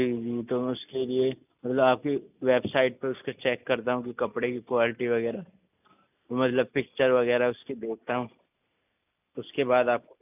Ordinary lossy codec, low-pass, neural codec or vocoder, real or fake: none; 3.6 kHz; none; real